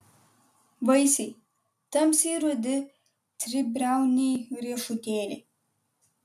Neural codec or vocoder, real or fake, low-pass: none; real; 14.4 kHz